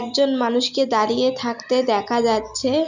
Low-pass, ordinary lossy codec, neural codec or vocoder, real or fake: 7.2 kHz; none; none; real